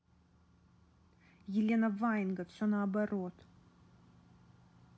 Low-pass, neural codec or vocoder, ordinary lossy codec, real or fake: none; none; none; real